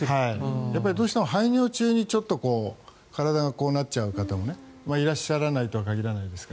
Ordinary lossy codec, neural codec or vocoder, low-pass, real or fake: none; none; none; real